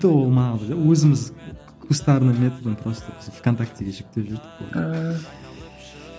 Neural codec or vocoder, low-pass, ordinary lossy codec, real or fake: none; none; none; real